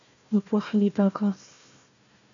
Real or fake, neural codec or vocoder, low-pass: fake; codec, 16 kHz, 1 kbps, FunCodec, trained on Chinese and English, 50 frames a second; 7.2 kHz